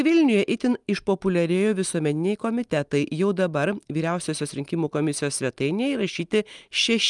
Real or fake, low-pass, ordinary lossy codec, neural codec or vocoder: real; 10.8 kHz; Opus, 32 kbps; none